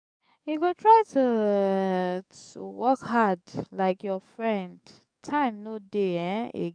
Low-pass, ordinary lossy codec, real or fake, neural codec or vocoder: 9.9 kHz; none; fake; codec, 44.1 kHz, 7.8 kbps, DAC